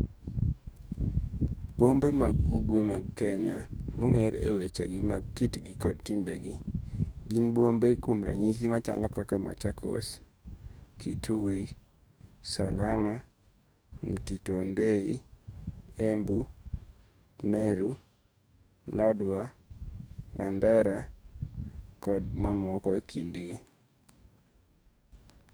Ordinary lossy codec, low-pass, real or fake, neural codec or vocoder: none; none; fake; codec, 44.1 kHz, 2.6 kbps, DAC